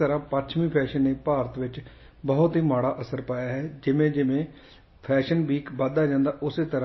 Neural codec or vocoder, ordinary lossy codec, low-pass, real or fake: none; MP3, 24 kbps; 7.2 kHz; real